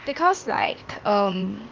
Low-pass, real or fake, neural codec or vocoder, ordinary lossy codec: 7.2 kHz; fake; codec, 16 kHz, 0.8 kbps, ZipCodec; Opus, 32 kbps